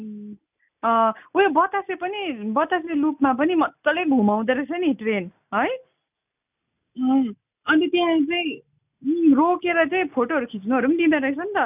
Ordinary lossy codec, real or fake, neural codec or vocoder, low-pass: none; real; none; 3.6 kHz